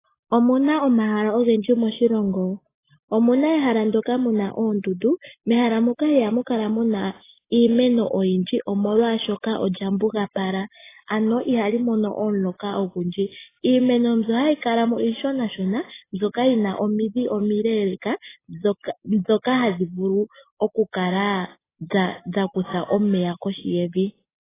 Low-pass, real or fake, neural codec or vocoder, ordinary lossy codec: 3.6 kHz; real; none; AAC, 16 kbps